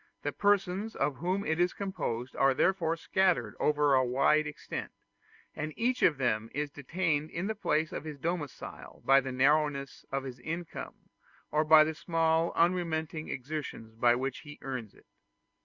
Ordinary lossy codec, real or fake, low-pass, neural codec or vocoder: Opus, 64 kbps; real; 7.2 kHz; none